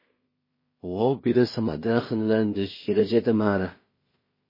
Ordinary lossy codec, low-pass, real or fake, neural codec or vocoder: MP3, 24 kbps; 5.4 kHz; fake; codec, 16 kHz in and 24 kHz out, 0.4 kbps, LongCat-Audio-Codec, two codebook decoder